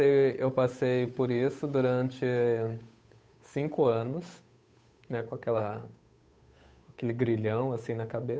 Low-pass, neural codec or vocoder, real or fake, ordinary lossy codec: none; codec, 16 kHz, 8 kbps, FunCodec, trained on Chinese and English, 25 frames a second; fake; none